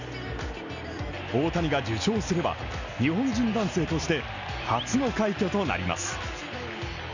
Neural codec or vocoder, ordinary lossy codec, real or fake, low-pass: none; none; real; 7.2 kHz